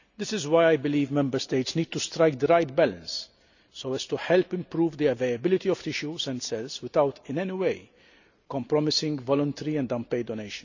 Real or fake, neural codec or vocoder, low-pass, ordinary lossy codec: real; none; 7.2 kHz; none